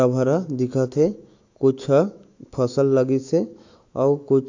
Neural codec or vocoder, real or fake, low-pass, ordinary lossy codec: autoencoder, 48 kHz, 128 numbers a frame, DAC-VAE, trained on Japanese speech; fake; 7.2 kHz; AAC, 48 kbps